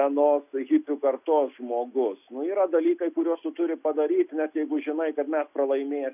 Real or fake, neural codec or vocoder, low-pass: real; none; 3.6 kHz